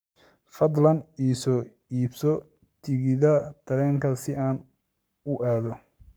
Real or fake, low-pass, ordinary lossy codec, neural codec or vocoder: fake; none; none; codec, 44.1 kHz, 7.8 kbps, Pupu-Codec